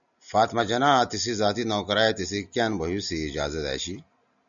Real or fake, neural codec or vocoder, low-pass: real; none; 7.2 kHz